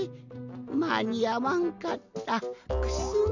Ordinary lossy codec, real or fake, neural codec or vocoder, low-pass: none; real; none; 7.2 kHz